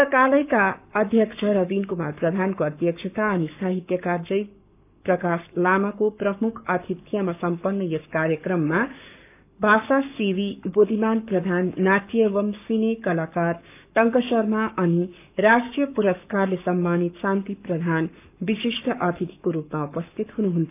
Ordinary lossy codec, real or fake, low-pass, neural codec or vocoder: none; fake; 3.6 kHz; codec, 44.1 kHz, 7.8 kbps, Pupu-Codec